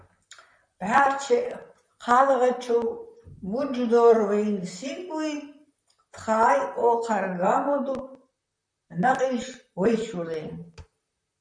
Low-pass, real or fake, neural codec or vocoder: 9.9 kHz; fake; vocoder, 44.1 kHz, 128 mel bands, Pupu-Vocoder